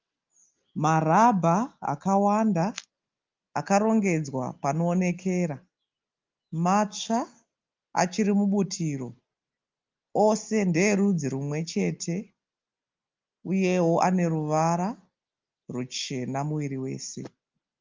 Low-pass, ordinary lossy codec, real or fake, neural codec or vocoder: 7.2 kHz; Opus, 32 kbps; real; none